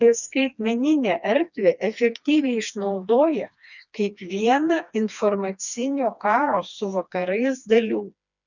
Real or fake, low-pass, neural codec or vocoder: fake; 7.2 kHz; codec, 16 kHz, 2 kbps, FreqCodec, smaller model